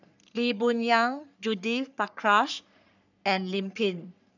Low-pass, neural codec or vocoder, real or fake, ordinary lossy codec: 7.2 kHz; codec, 44.1 kHz, 3.4 kbps, Pupu-Codec; fake; none